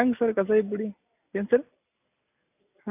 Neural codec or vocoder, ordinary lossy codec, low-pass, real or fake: none; AAC, 32 kbps; 3.6 kHz; real